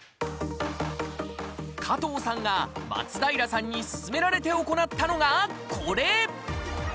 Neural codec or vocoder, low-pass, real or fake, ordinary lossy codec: none; none; real; none